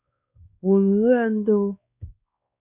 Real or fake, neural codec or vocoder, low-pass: fake; codec, 16 kHz, 2 kbps, X-Codec, WavLM features, trained on Multilingual LibriSpeech; 3.6 kHz